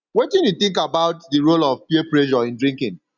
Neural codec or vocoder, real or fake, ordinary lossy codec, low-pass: none; real; none; 7.2 kHz